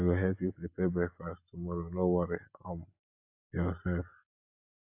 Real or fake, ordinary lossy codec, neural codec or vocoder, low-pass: real; AAC, 32 kbps; none; 3.6 kHz